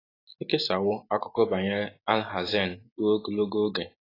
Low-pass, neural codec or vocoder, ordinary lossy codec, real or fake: 5.4 kHz; none; AAC, 24 kbps; real